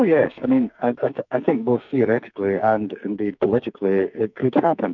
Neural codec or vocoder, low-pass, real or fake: codec, 44.1 kHz, 2.6 kbps, SNAC; 7.2 kHz; fake